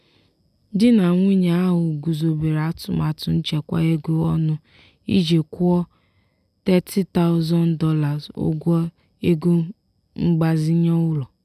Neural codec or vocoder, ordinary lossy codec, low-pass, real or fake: none; none; 14.4 kHz; real